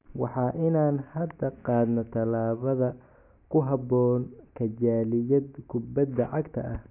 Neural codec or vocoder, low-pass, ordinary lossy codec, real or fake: none; 3.6 kHz; none; real